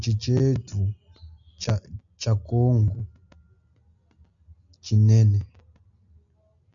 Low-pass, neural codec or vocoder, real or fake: 7.2 kHz; none; real